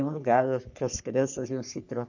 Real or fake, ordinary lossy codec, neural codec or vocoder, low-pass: fake; none; codec, 44.1 kHz, 3.4 kbps, Pupu-Codec; 7.2 kHz